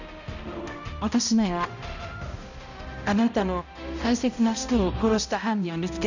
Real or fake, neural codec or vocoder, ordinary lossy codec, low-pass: fake; codec, 16 kHz, 0.5 kbps, X-Codec, HuBERT features, trained on balanced general audio; none; 7.2 kHz